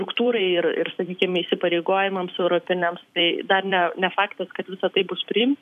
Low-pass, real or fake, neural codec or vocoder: 14.4 kHz; fake; vocoder, 44.1 kHz, 128 mel bands every 256 samples, BigVGAN v2